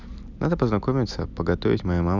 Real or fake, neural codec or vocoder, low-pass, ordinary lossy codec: real; none; 7.2 kHz; none